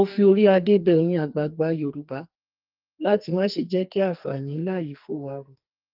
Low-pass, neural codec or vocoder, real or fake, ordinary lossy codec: 5.4 kHz; codec, 44.1 kHz, 2.6 kbps, SNAC; fake; Opus, 32 kbps